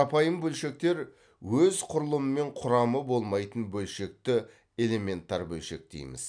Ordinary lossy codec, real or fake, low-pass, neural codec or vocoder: none; real; 9.9 kHz; none